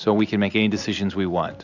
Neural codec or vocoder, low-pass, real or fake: none; 7.2 kHz; real